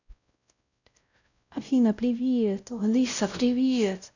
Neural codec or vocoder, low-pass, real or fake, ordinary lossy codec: codec, 16 kHz, 0.5 kbps, X-Codec, WavLM features, trained on Multilingual LibriSpeech; 7.2 kHz; fake; none